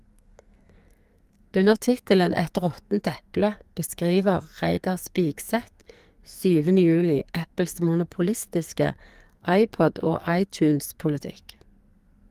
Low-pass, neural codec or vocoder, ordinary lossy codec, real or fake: 14.4 kHz; codec, 44.1 kHz, 2.6 kbps, SNAC; Opus, 32 kbps; fake